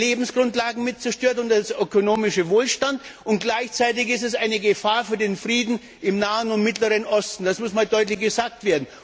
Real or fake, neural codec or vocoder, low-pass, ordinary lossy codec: real; none; none; none